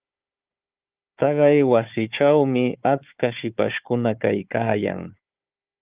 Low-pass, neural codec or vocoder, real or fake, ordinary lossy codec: 3.6 kHz; codec, 16 kHz, 4 kbps, FunCodec, trained on Chinese and English, 50 frames a second; fake; Opus, 24 kbps